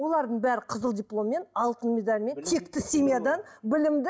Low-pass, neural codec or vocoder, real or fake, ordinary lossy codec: none; none; real; none